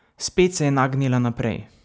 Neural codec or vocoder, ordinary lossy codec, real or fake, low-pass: none; none; real; none